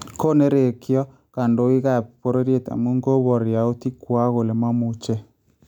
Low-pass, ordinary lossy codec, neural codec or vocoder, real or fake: 19.8 kHz; none; none; real